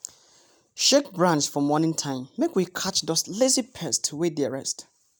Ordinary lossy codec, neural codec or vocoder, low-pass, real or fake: none; none; none; real